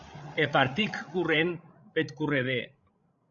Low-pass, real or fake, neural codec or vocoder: 7.2 kHz; fake; codec, 16 kHz, 16 kbps, FreqCodec, larger model